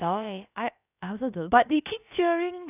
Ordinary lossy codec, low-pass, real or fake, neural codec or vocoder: none; 3.6 kHz; fake; codec, 16 kHz, about 1 kbps, DyCAST, with the encoder's durations